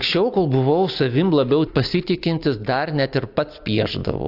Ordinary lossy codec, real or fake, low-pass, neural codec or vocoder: AAC, 48 kbps; real; 5.4 kHz; none